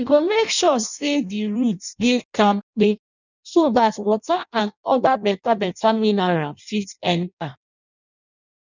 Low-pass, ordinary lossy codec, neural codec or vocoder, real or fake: 7.2 kHz; none; codec, 16 kHz in and 24 kHz out, 0.6 kbps, FireRedTTS-2 codec; fake